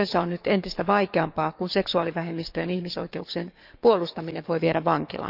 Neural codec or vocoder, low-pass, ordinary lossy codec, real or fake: codec, 44.1 kHz, 7.8 kbps, DAC; 5.4 kHz; none; fake